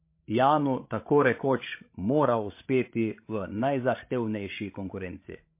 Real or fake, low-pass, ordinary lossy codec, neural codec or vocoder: fake; 3.6 kHz; MP3, 24 kbps; codec, 16 kHz, 16 kbps, FreqCodec, larger model